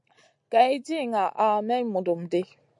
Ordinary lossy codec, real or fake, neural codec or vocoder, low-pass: AAC, 64 kbps; real; none; 10.8 kHz